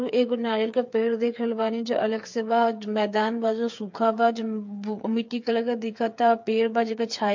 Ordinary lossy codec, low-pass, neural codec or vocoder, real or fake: MP3, 48 kbps; 7.2 kHz; codec, 16 kHz, 8 kbps, FreqCodec, smaller model; fake